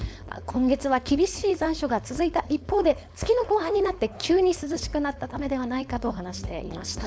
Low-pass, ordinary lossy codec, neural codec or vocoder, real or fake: none; none; codec, 16 kHz, 4.8 kbps, FACodec; fake